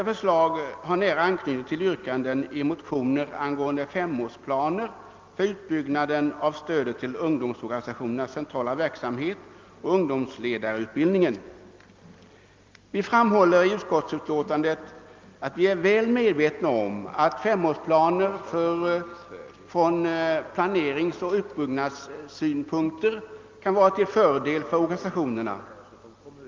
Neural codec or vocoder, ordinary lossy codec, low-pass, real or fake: none; Opus, 24 kbps; 7.2 kHz; real